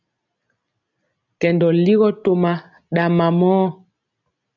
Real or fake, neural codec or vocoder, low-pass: real; none; 7.2 kHz